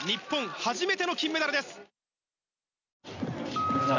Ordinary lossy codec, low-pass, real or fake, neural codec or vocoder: none; 7.2 kHz; real; none